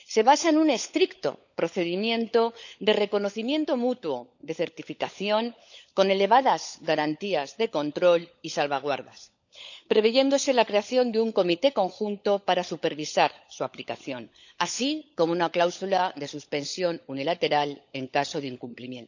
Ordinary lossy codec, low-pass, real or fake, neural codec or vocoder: none; 7.2 kHz; fake; codec, 16 kHz, 16 kbps, FunCodec, trained on LibriTTS, 50 frames a second